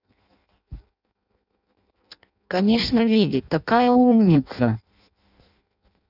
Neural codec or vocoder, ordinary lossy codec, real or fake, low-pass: codec, 16 kHz in and 24 kHz out, 0.6 kbps, FireRedTTS-2 codec; none; fake; 5.4 kHz